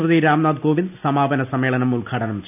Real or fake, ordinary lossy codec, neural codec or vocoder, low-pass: real; none; none; 3.6 kHz